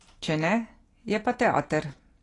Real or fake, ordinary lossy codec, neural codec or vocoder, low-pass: real; AAC, 32 kbps; none; 10.8 kHz